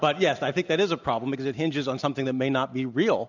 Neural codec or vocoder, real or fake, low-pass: none; real; 7.2 kHz